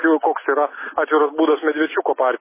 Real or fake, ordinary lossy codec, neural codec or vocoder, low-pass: real; MP3, 16 kbps; none; 3.6 kHz